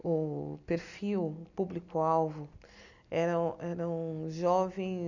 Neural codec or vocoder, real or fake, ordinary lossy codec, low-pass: none; real; none; 7.2 kHz